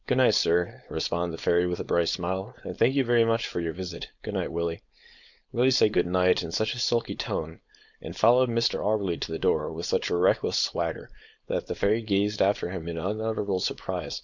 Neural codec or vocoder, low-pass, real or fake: codec, 16 kHz, 4.8 kbps, FACodec; 7.2 kHz; fake